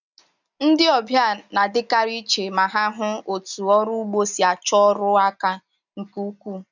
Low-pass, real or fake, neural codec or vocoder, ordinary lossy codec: 7.2 kHz; real; none; none